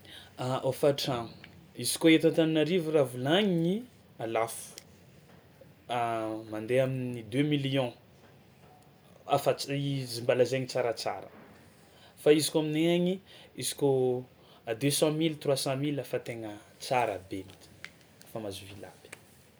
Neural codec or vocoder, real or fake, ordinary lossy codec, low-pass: none; real; none; none